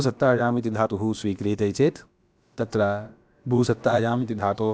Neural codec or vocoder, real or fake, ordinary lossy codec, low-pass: codec, 16 kHz, about 1 kbps, DyCAST, with the encoder's durations; fake; none; none